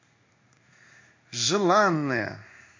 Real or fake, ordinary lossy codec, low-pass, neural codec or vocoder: fake; MP3, 64 kbps; 7.2 kHz; codec, 16 kHz in and 24 kHz out, 1 kbps, XY-Tokenizer